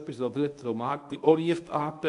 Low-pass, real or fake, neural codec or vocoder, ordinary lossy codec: 10.8 kHz; fake; codec, 24 kHz, 0.9 kbps, WavTokenizer, medium speech release version 1; AAC, 64 kbps